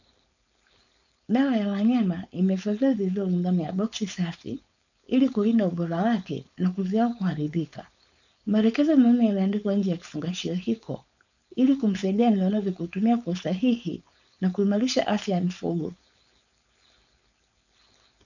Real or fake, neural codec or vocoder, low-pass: fake; codec, 16 kHz, 4.8 kbps, FACodec; 7.2 kHz